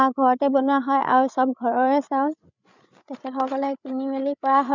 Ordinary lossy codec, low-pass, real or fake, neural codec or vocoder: none; 7.2 kHz; fake; codec, 16 kHz, 8 kbps, FreqCodec, larger model